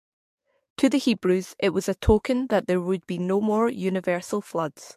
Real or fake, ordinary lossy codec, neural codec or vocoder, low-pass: fake; MP3, 64 kbps; codec, 44.1 kHz, 7.8 kbps, DAC; 14.4 kHz